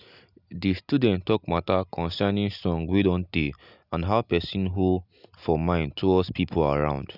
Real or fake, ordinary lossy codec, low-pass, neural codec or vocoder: real; none; 5.4 kHz; none